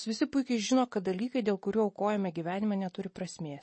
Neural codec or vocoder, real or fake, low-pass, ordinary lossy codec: none; real; 9.9 kHz; MP3, 32 kbps